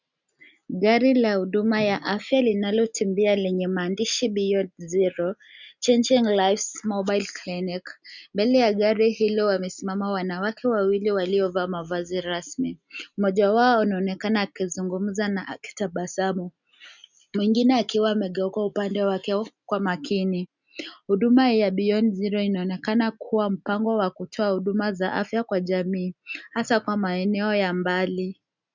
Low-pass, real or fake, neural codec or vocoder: 7.2 kHz; real; none